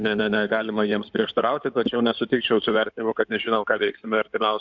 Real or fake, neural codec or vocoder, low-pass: fake; codec, 16 kHz in and 24 kHz out, 2.2 kbps, FireRedTTS-2 codec; 7.2 kHz